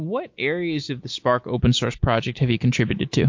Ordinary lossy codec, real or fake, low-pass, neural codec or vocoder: MP3, 48 kbps; real; 7.2 kHz; none